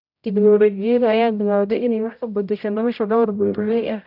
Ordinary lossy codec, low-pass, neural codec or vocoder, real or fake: none; 5.4 kHz; codec, 16 kHz, 0.5 kbps, X-Codec, HuBERT features, trained on general audio; fake